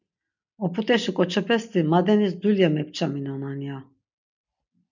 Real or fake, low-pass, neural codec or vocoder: real; 7.2 kHz; none